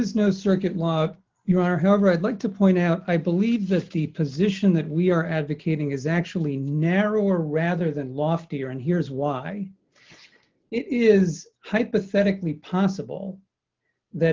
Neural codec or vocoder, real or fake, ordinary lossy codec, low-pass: none; real; Opus, 24 kbps; 7.2 kHz